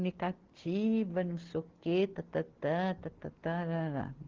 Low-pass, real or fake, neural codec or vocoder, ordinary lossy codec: 7.2 kHz; real; none; Opus, 16 kbps